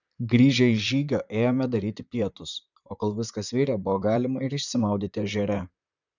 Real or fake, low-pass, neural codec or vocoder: fake; 7.2 kHz; vocoder, 44.1 kHz, 128 mel bands, Pupu-Vocoder